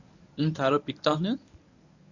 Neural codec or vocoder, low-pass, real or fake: codec, 24 kHz, 0.9 kbps, WavTokenizer, medium speech release version 1; 7.2 kHz; fake